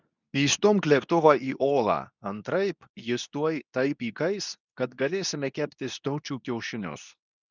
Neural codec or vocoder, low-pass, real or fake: codec, 24 kHz, 0.9 kbps, WavTokenizer, medium speech release version 2; 7.2 kHz; fake